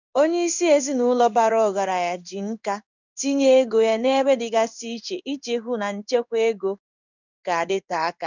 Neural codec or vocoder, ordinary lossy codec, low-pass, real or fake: codec, 16 kHz in and 24 kHz out, 1 kbps, XY-Tokenizer; none; 7.2 kHz; fake